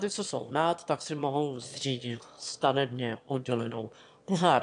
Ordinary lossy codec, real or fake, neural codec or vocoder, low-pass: AAC, 64 kbps; fake; autoencoder, 22.05 kHz, a latent of 192 numbers a frame, VITS, trained on one speaker; 9.9 kHz